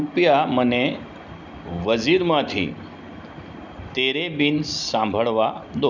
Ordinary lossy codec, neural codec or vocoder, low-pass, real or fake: none; none; 7.2 kHz; real